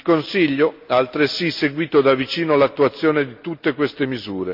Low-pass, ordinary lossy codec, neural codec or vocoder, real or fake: 5.4 kHz; MP3, 48 kbps; none; real